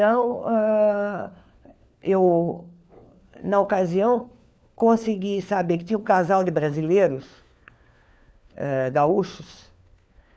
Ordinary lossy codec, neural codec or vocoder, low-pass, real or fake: none; codec, 16 kHz, 4 kbps, FunCodec, trained on LibriTTS, 50 frames a second; none; fake